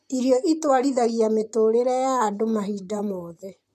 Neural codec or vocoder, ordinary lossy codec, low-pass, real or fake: vocoder, 44.1 kHz, 128 mel bands, Pupu-Vocoder; MP3, 64 kbps; 14.4 kHz; fake